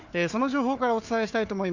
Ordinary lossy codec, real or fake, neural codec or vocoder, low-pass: none; fake; codec, 16 kHz, 4 kbps, FunCodec, trained on Chinese and English, 50 frames a second; 7.2 kHz